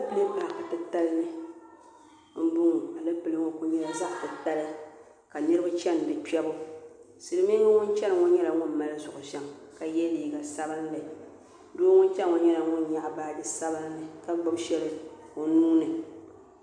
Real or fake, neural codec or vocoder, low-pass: real; none; 9.9 kHz